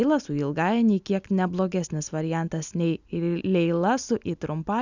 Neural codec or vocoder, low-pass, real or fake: none; 7.2 kHz; real